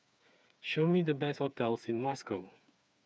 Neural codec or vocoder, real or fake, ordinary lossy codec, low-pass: codec, 16 kHz, 4 kbps, FreqCodec, smaller model; fake; none; none